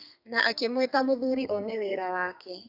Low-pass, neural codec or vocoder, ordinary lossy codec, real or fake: 5.4 kHz; codec, 32 kHz, 1.9 kbps, SNAC; AAC, 48 kbps; fake